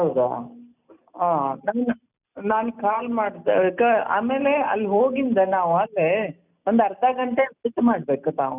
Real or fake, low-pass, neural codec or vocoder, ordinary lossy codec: real; 3.6 kHz; none; none